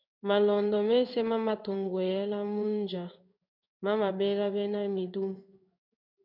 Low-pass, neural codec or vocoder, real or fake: 5.4 kHz; codec, 16 kHz in and 24 kHz out, 1 kbps, XY-Tokenizer; fake